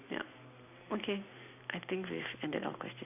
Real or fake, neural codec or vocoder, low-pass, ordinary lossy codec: real; none; 3.6 kHz; none